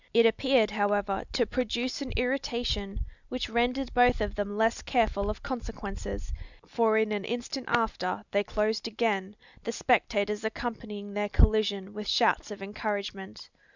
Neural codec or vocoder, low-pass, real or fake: none; 7.2 kHz; real